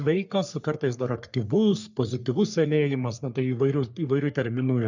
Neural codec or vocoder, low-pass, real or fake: codec, 44.1 kHz, 3.4 kbps, Pupu-Codec; 7.2 kHz; fake